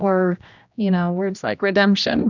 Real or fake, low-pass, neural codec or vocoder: fake; 7.2 kHz; codec, 16 kHz, 1 kbps, X-Codec, HuBERT features, trained on general audio